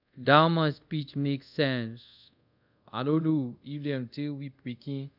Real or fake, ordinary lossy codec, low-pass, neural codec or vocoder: fake; none; 5.4 kHz; codec, 24 kHz, 0.5 kbps, DualCodec